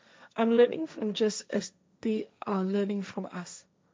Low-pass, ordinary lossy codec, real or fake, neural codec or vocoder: none; none; fake; codec, 16 kHz, 1.1 kbps, Voila-Tokenizer